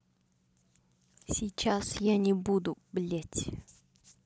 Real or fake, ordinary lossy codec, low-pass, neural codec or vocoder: real; none; none; none